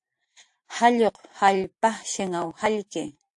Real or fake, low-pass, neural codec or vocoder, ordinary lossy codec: fake; 10.8 kHz; vocoder, 44.1 kHz, 128 mel bands every 512 samples, BigVGAN v2; AAC, 64 kbps